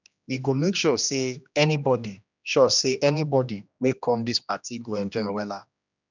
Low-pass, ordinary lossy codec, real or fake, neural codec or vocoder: 7.2 kHz; none; fake; codec, 16 kHz, 1 kbps, X-Codec, HuBERT features, trained on general audio